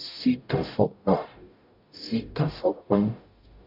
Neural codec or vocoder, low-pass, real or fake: codec, 44.1 kHz, 0.9 kbps, DAC; 5.4 kHz; fake